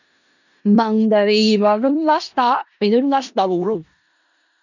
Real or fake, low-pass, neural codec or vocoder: fake; 7.2 kHz; codec, 16 kHz in and 24 kHz out, 0.4 kbps, LongCat-Audio-Codec, four codebook decoder